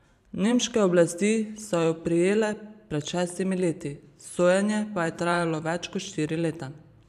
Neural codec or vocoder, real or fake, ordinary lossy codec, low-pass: vocoder, 44.1 kHz, 128 mel bands every 512 samples, BigVGAN v2; fake; none; 14.4 kHz